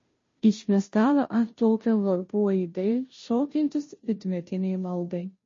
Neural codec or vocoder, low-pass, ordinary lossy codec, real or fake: codec, 16 kHz, 0.5 kbps, FunCodec, trained on Chinese and English, 25 frames a second; 7.2 kHz; MP3, 32 kbps; fake